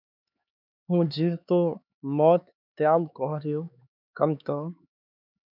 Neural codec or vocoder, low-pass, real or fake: codec, 16 kHz, 4 kbps, X-Codec, HuBERT features, trained on LibriSpeech; 5.4 kHz; fake